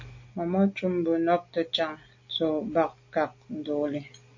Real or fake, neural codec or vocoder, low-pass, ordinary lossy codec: real; none; 7.2 kHz; MP3, 48 kbps